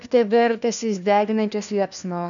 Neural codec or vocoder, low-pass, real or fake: codec, 16 kHz, 1 kbps, FunCodec, trained on LibriTTS, 50 frames a second; 7.2 kHz; fake